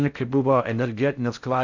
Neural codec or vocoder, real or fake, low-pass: codec, 16 kHz in and 24 kHz out, 0.6 kbps, FocalCodec, streaming, 4096 codes; fake; 7.2 kHz